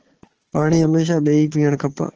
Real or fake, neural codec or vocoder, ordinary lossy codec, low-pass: fake; codec, 16 kHz, 4 kbps, FunCodec, trained on Chinese and English, 50 frames a second; Opus, 16 kbps; 7.2 kHz